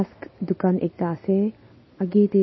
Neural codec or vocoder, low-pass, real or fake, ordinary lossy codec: none; 7.2 kHz; real; MP3, 24 kbps